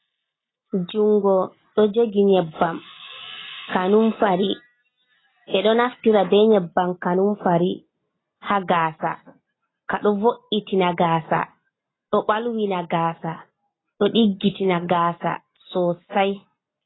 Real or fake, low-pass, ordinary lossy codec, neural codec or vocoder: real; 7.2 kHz; AAC, 16 kbps; none